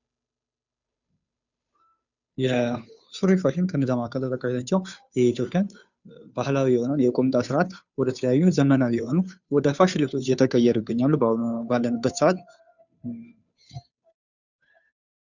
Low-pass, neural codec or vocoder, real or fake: 7.2 kHz; codec, 16 kHz, 2 kbps, FunCodec, trained on Chinese and English, 25 frames a second; fake